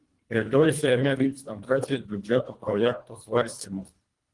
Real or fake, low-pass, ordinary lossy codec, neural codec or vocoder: fake; 10.8 kHz; Opus, 24 kbps; codec, 24 kHz, 1.5 kbps, HILCodec